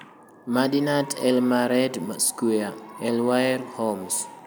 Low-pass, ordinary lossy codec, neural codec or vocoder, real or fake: none; none; none; real